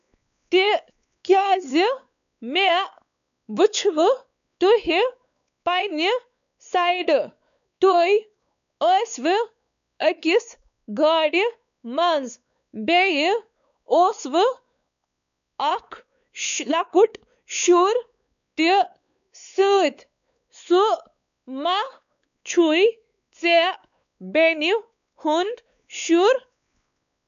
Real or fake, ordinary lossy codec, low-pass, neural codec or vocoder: fake; none; 7.2 kHz; codec, 16 kHz, 4 kbps, X-Codec, WavLM features, trained on Multilingual LibriSpeech